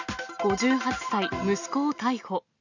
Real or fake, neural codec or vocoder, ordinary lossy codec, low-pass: real; none; none; 7.2 kHz